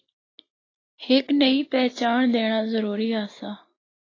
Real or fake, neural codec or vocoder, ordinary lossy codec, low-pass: real; none; AAC, 32 kbps; 7.2 kHz